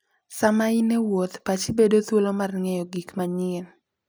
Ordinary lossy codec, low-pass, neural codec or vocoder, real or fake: none; none; none; real